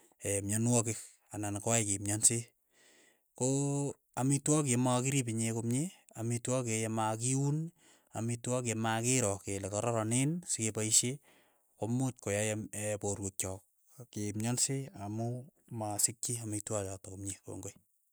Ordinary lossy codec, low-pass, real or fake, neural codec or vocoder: none; none; real; none